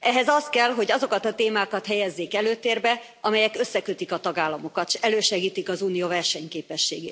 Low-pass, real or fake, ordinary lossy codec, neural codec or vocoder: none; real; none; none